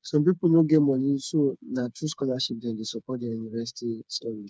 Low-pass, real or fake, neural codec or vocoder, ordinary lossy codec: none; fake; codec, 16 kHz, 4 kbps, FreqCodec, smaller model; none